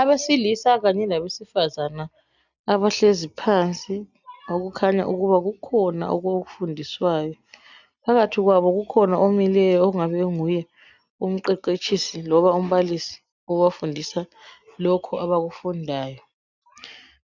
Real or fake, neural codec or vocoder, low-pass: real; none; 7.2 kHz